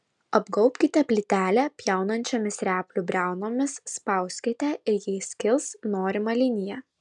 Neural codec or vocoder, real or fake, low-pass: none; real; 10.8 kHz